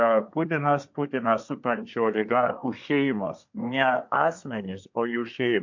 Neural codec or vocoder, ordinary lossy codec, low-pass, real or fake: codec, 24 kHz, 1 kbps, SNAC; MP3, 48 kbps; 7.2 kHz; fake